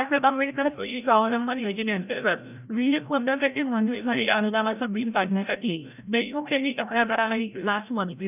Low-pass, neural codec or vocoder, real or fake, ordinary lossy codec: 3.6 kHz; codec, 16 kHz, 0.5 kbps, FreqCodec, larger model; fake; none